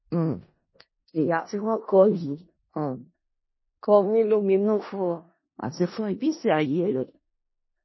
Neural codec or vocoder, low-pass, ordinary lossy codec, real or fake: codec, 16 kHz in and 24 kHz out, 0.4 kbps, LongCat-Audio-Codec, four codebook decoder; 7.2 kHz; MP3, 24 kbps; fake